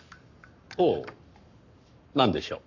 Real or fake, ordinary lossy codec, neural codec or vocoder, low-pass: fake; none; codec, 44.1 kHz, 7.8 kbps, Pupu-Codec; 7.2 kHz